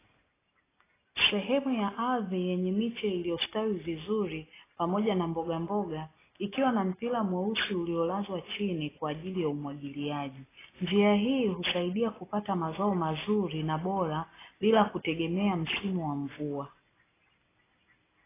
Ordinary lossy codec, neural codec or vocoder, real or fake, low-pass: AAC, 16 kbps; none; real; 3.6 kHz